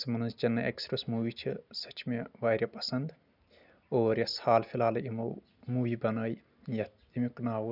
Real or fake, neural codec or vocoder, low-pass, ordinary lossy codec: real; none; 5.4 kHz; none